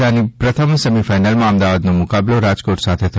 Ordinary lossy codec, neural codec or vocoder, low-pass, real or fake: none; none; none; real